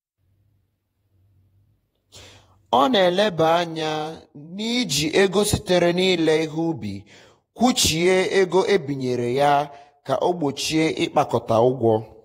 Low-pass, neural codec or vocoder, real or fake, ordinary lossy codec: 19.8 kHz; vocoder, 48 kHz, 128 mel bands, Vocos; fake; AAC, 48 kbps